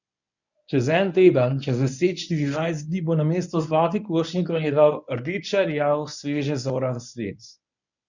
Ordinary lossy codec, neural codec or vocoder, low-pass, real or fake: none; codec, 24 kHz, 0.9 kbps, WavTokenizer, medium speech release version 1; 7.2 kHz; fake